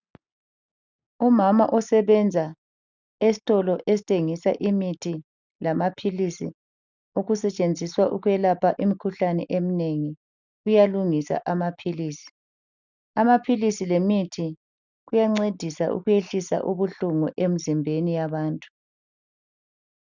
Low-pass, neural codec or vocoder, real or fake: 7.2 kHz; none; real